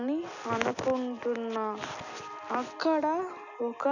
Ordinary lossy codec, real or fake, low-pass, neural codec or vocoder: none; real; 7.2 kHz; none